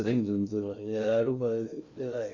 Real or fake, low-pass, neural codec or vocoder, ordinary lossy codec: fake; 7.2 kHz; codec, 16 kHz in and 24 kHz out, 0.6 kbps, FocalCodec, streaming, 2048 codes; none